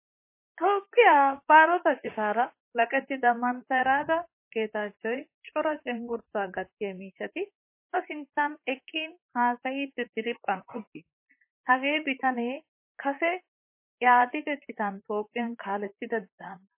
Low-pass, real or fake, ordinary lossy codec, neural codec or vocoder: 3.6 kHz; fake; MP3, 24 kbps; vocoder, 44.1 kHz, 128 mel bands, Pupu-Vocoder